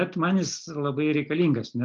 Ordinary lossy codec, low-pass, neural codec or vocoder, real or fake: Opus, 24 kbps; 7.2 kHz; none; real